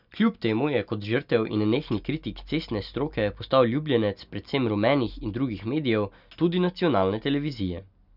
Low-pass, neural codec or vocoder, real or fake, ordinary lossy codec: 5.4 kHz; none; real; none